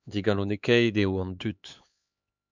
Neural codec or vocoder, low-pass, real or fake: codec, 16 kHz, 4 kbps, X-Codec, HuBERT features, trained on LibriSpeech; 7.2 kHz; fake